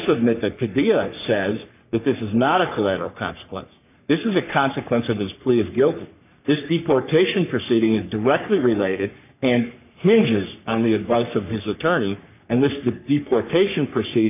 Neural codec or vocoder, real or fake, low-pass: codec, 44.1 kHz, 3.4 kbps, Pupu-Codec; fake; 3.6 kHz